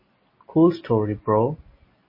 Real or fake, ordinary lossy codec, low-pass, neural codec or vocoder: real; MP3, 24 kbps; 5.4 kHz; none